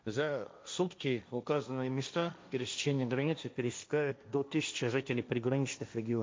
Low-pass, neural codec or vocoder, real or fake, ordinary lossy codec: none; codec, 16 kHz, 1.1 kbps, Voila-Tokenizer; fake; none